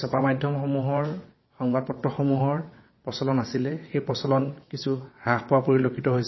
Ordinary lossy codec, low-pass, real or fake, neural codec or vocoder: MP3, 24 kbps; 7.2 kHz; fake; vocoder, 22.05 kHz, 80 mel bands, WaveNeXt